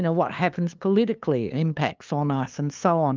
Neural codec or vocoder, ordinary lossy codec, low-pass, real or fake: codec, 16 kHz, 2 kbps, FunCodec, trained on LibriTTS, 25 frames a second; Opus, 24 kbps; 7.2 kHz; fake